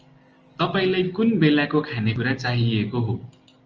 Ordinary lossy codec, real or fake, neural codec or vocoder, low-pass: Opus, 24 kbps; real; none; 7.2 kHz